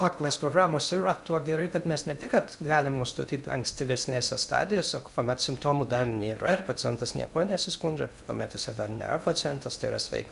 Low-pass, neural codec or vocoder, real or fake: 10.8 kHz; codec, 16 kHz in and 24 kHz out, 0.6 kbps, FocalCodec, streaming, 2048 codes; fake